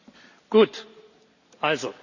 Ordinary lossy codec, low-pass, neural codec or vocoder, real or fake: none; 7.2 kHz; none; real